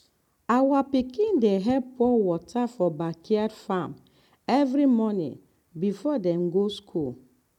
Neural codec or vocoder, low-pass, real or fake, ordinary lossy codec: none; 19.8 kHz; real; none